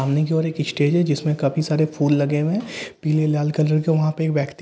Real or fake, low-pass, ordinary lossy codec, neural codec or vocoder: real; none; none; none